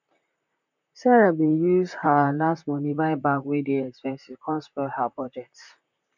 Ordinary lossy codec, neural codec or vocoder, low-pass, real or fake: none; vocoder, 44.1 kHz, 128 mel bands, Pupu-Vocoder; 7.2 kHz; fake